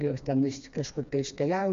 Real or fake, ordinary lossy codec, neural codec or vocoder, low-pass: fake; MP3, 48 kbps; codec, 16 kHz, 2 kbps, FreqCodec, smaller model; 7.2 kHz